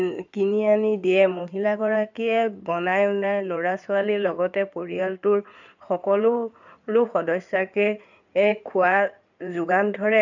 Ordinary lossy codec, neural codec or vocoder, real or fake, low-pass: none; codec, 16 kHz in and 24 kHz out, 2.2 kbps, FireRedTTS-2 codec; fake; 7.2 kHz